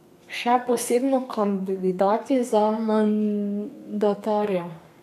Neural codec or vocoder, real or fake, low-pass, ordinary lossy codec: codec, 32 kHz, 1.9 kbps, SNAC; fake; 14.4 kHz; MP3, 96 kbps